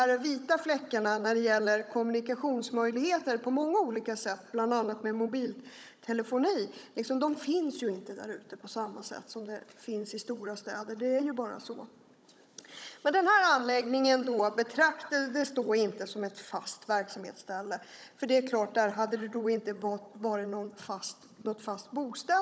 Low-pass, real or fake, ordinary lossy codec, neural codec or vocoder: none; fake; none; codec, 16 kHz, 16 kbps, FunCodec, trained on Chinese and English, 50 frames a second